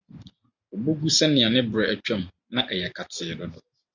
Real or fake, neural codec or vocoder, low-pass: real; none; 7.2 kHz